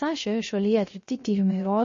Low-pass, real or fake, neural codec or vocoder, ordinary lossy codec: 7.2 kHz; fake; codec, 16 kHz, 0.3 kbps, FocalCodec; MP3, 32 kbps